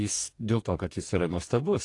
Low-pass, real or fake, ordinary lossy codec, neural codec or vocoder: 10.8 kHz; fake; AAC, 48 kbps; codec, 44.1 kHz, 2.6 kbps, DAC